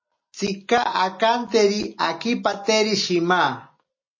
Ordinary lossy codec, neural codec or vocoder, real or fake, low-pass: MP3, 32 kbps; none; real; 7.2 kHz